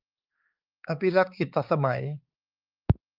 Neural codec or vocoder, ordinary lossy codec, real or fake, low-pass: codec, 16 kHz, 4 kbps, X-Codec, HuBERT features, trained on balanced general audio; Opus, 24 kbps; fake; 5.4 kHz